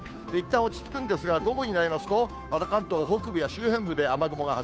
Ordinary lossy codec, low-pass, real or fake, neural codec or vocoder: none; none; fake; codec, 16 kHz, 2 kbps, FunCodec, trained on Chinese and English, 25 frames a second